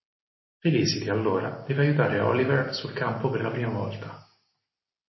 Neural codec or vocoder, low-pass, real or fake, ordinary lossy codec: none; 7.2 kHz; real; MP3, 24 kbps